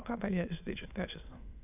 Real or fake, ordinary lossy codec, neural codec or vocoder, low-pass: fake; none; autoencoder, 22.05 kHz, a latent of 192 numbers a frame, VITS, trained on many speakers; 3.6 kHz